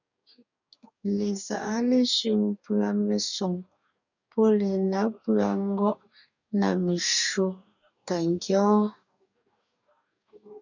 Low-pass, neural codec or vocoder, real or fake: 7.2 kHz; codec, 44.1 kHz, 2.6 kbps, DAC; fake